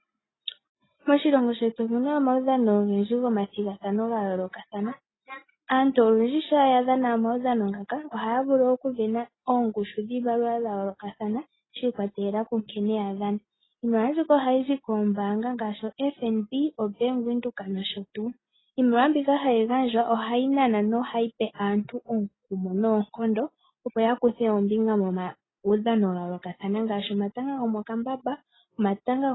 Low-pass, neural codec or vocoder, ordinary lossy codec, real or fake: 7.2 kHz; none; AAC, 16 kbps; real